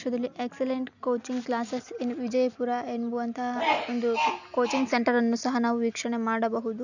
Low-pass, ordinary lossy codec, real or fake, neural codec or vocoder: 7.2 kHz; none; real; none